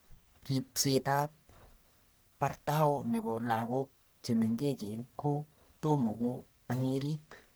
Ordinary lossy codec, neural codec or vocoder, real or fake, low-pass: none; codec, 44.1 kHz, 1.7 kbps, Pupu-Codec; fake; none